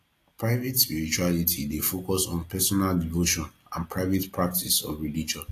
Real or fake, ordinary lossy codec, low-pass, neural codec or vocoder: real; AAC, 48 kbps; 14.4 kHz; none